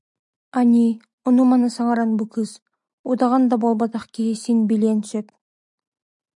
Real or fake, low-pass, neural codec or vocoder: real; 10.8 kHz; none